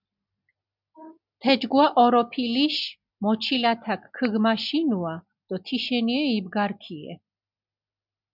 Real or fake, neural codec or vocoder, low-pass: real; none; 5.4 kHz